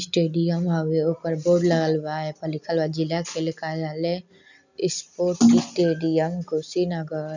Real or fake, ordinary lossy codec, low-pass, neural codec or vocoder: real; none; 7.2 kHz; none